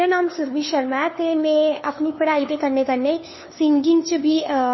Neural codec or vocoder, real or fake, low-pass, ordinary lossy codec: codec, 16 kHz, 2 kbps, FunCodec, trained on LibriTTS, 25 frames a second; fake; 7.2 kHz; MP3, 24 kbps